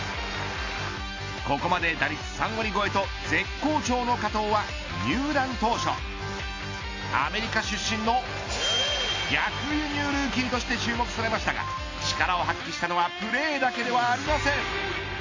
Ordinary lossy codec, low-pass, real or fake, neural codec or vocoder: AAC, 32 kbps; 7.2 kHz; real; none